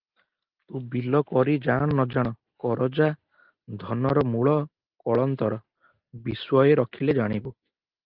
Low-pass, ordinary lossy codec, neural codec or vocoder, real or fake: 5.4 kHz; Opus, 32 kbps; none; real